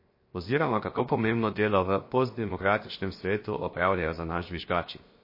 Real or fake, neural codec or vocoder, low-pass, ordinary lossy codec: fake; codec, 16 kHz, 0.7 kbps, FocalCodec; 5.4 kHz; MP3, 24 kbps